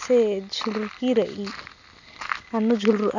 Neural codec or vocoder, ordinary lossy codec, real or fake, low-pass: none; none; real; 7.2 kHz